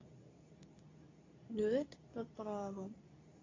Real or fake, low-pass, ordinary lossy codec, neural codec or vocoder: fake; 7.2 kHz; AAC, 32 kbps; codec, 24 kHz, 0.9 kbps, WavTokenizer, medium speech release version 2